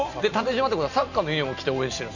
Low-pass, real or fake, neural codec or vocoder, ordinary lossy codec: 7.2 kHz; real; none; none